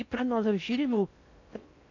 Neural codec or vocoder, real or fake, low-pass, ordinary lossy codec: codec, 16 kHz in and 24 kHz out, 0.6 kbps, FocalCodec, streaming, 4096 codes; fake; 7.2 kHz; none